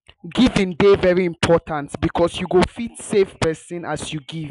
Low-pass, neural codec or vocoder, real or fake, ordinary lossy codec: 10.8 kHz; none; real; none